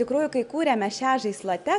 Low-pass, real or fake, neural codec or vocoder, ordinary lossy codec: 10.8 kHz; real; none; MP3, 96 kbps